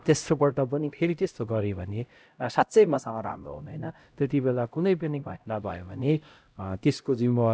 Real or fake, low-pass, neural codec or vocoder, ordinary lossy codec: fake; none; codec, 16 kHz, 0.5 kbps, X-Codec, HuBERT features, trained on LibriSpeech; none